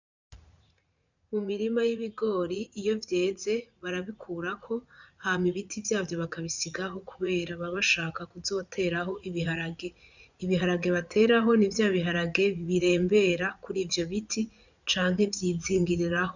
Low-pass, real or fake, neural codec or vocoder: 7.2 kHz; fake; vocoder, 44.1 kHz, 80 mel bands, Vocos